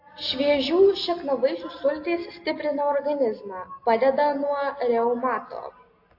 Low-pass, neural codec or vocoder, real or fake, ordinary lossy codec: 5.4 kHz; none; real; AAC, 32 kbps